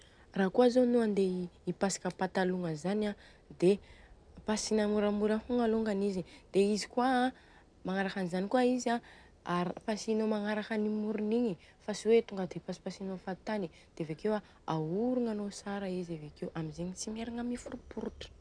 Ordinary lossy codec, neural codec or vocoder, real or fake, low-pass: none; none; real; 9.9 kHz